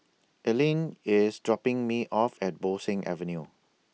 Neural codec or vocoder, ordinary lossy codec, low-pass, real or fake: none; none; none; real